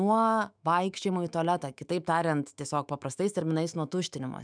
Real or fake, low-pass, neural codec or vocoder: fake; 9.9 kHz; autoencoder, 48 kHz, 128 numbers a frame, DAC-VAE, trained on Japanese speech